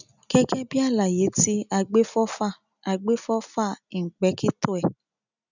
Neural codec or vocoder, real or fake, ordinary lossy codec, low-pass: none; real; none; 7.2 kHz